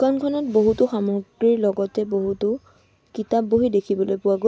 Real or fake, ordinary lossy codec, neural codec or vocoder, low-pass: real; none; none; none